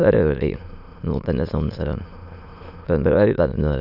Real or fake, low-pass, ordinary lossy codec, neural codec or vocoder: fake; 5.4 kHz; none; autoencoder, 22.05 kHz, a latent of 192 numbers a frame, VITS, trained on many speakers